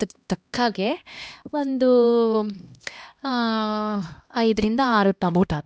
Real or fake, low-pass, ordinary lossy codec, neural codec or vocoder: fake; none; none; codec, 16 kHz, 1 kbps, X-Codec, HuBERT features, trained on LibriSpeech